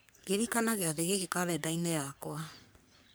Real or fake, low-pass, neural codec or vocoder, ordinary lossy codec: fake; none; codec, 44.1 kHz, 3.4 kbps, Pupu-Codec; none